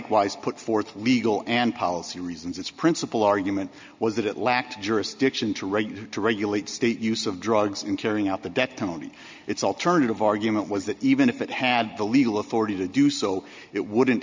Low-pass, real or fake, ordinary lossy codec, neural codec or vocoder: 7.2 kHz; real; MP3, 64 kbps; none